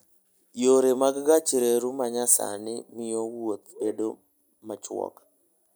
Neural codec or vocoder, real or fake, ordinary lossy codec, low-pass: none; real; none; none